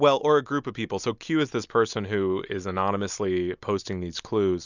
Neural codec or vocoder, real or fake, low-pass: none; real; 7.2 kHz